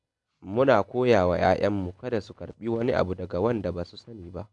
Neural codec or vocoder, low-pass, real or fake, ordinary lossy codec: none; 10.8 kHz; real; AAC, 64 kbps